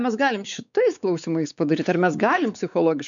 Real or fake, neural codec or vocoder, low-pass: fake; codec, 16 kHz, 4 kbps, X-Codec, WavLM features, trained on Multilingual LibriSpeech; 7.2 kHz